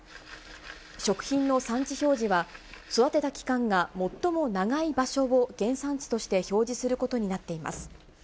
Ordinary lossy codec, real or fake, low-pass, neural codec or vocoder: none; real; none; none